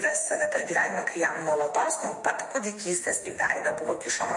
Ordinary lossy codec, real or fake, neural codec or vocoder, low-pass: MP3, 48 kbps; fake; autoencoder, 48 kHz, 32 numbers a frame, DAC-VAE, trained on Japanese speech; 10.8 kHz